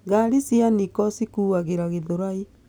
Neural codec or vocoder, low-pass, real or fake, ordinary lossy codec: none; none; real; none